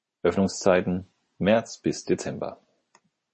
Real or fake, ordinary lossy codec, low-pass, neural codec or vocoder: real; MP3, 32 kbps; 10.8 kHz; none